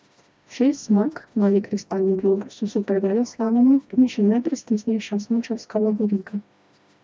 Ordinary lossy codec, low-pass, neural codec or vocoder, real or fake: none; none; codec, 16 kHz, 1 kbps, FreqCodec, smaller model; fake